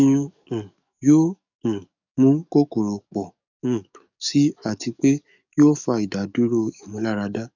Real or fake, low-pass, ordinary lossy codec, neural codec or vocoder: fake; 7.2 kHz; none; codec, 44.1 kHz, 7.8 kbps, DAC